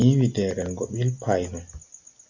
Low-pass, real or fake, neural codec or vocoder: 7.2 kHz; real; none